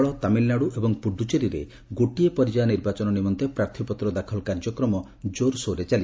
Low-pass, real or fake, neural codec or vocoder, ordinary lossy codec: none; real; none; none